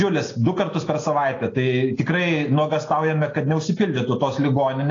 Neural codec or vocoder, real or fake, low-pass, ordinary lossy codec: none; real; 7.2 kHz; AAC, 32 kbps